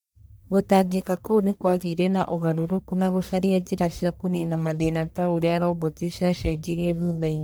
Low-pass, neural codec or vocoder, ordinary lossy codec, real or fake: none; codec, 44.1 kHz, 1.7 kbps, Pupu-Codec; none; fake